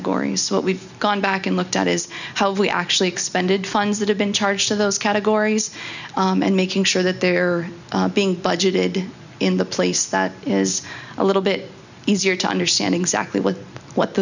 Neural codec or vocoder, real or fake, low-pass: none; real; 7.2 kHz